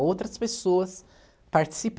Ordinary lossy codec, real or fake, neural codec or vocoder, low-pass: none; real; none; none